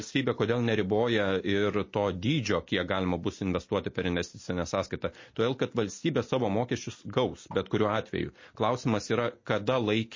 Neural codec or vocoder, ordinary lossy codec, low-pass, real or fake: none; MP3, 32 kbps; 7.2 kHz; real